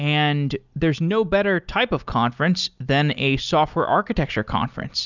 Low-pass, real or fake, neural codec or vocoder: 7.2 kHz; real; none